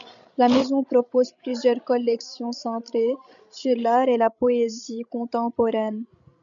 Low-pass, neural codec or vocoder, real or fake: 7.2 kHz; codec, 16 kHz, 16 kbps, FreqCodec, larger model; fake